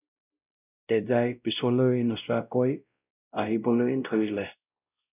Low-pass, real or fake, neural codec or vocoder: 3.6 kHz; fake; codec, 16 kHz, 0.5 kbps, X-Codec, WavLM features, trained on Multilingual LibriSpeech